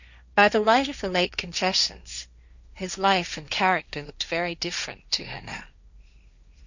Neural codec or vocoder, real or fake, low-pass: codec, 16 kHz, 1.1 kbps, Voila-Tokenizer; fake; 7.2 kHz